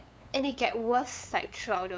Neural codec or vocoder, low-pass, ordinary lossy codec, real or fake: codec, 16 kHz, 8 kbps, FunCodec, trained on LibriTTS, 25 frames a second; none; none; fake